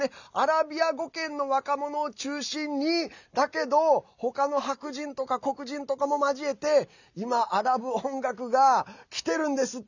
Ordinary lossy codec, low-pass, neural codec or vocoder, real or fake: none; 7.2 kHz; none; real